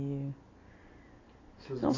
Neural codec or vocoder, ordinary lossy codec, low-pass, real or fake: none; AAC, 32 kbps; 7.2 kHz; real